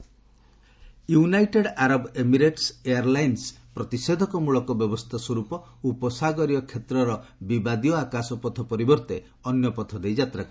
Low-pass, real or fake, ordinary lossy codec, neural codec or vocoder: none; real; none; none